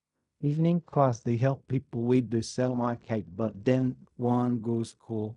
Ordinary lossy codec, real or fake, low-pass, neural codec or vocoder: none; fake; 10.8 kHz; codec, 16 kHz in and 24 kHz out, 0.4 kbps, LongCat-Audio-Codec, fine tuned four codebook decoder